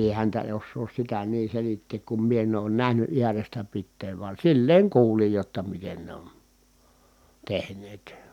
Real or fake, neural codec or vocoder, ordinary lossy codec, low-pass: real; none; none; 19.8 kHz